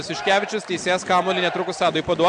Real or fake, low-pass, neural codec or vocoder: real; 9.9 kHz; none